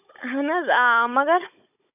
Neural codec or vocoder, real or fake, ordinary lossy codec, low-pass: codec, 16 kHz, 16 kbps, FunCodec, trained on Chinese and English, 50 frames a second; fake; none; 3.6 kHz